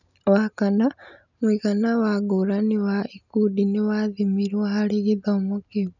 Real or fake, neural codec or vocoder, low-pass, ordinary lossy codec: real; none; 7.2 kHz; none